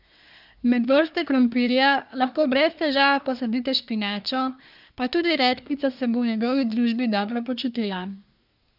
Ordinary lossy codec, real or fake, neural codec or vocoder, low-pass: none; fake; codec, 24 kHz, 1 kbps, SNAC; 5.4 kHz